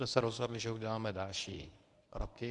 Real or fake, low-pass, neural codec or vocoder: fake; 10.8 kHz; codec, 24 kHz, 0.9 kbps, WavTokenizer, medium speech release version 1